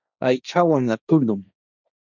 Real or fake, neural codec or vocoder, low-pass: fake; codec, 16 kHz, 1.1 kbps, Voila-Tokenizer; 7.2 kHz